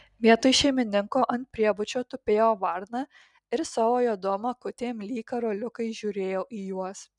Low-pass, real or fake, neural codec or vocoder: 10.8 kHz; real; none